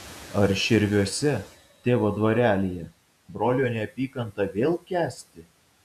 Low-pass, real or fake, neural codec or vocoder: 14.4 kHz; fake; vocoder, 48 kHz, 128 mel bands, Vocos